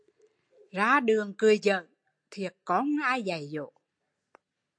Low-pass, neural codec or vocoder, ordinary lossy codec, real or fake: 9.9 kHz; none; AAC, 64 kbps; real